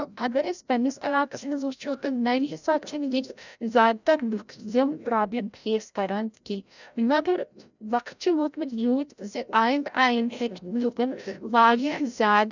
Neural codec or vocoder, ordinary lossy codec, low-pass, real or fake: codec, 16 kHz, 0.5 kbps, FreqCodec, larger model; none; 7.2 kHz; fake